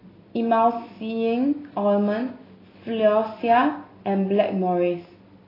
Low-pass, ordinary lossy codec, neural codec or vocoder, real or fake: 5.4 kHz; AAC, 24 kbps; none; real